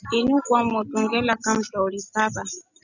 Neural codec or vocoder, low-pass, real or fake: none; 7.2 kHz; real